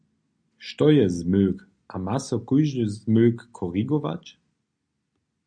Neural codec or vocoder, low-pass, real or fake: none; 9.9 kHz; real